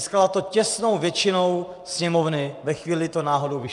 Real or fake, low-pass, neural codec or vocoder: fake; 10.8 kHz; vocoder, 24 kHz, 100 mel bands, Vocos